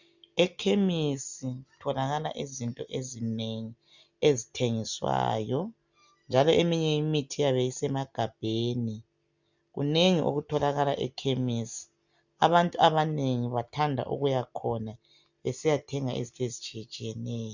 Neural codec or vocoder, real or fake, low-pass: none; real; 7.2 kHz